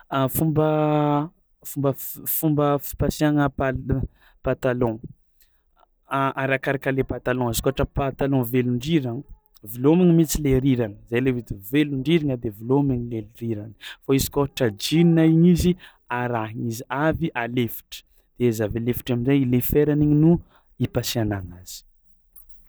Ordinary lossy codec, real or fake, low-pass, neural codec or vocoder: none; real; none; none